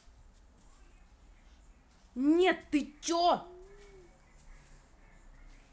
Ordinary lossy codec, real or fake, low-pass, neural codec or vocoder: none; real; none; none